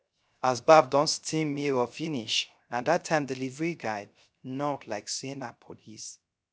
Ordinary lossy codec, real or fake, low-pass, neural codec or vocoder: none; fake; none; codec, 16 kHz, 0.3 kbps, FocalCodec